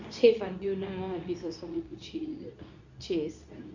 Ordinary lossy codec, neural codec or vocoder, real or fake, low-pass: none; codec, 24 kHz, 0.9 kbps, WavTokenizer, medium speech release version 2; fake; 7.2 kHz